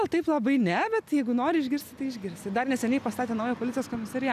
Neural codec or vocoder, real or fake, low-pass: none; real; 14.4 kHz